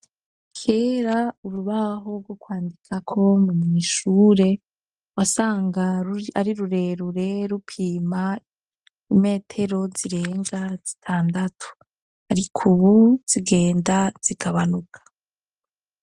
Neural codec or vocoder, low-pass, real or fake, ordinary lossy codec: none; 10.8 kHz; real; Opus, 32 kbps